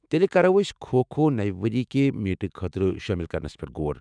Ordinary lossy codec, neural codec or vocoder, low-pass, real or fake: none; autoencoder, 48 kHz, 128 numbers a frame, DAC-VAE, trained on Japanese speech; 9.9 kHz; fake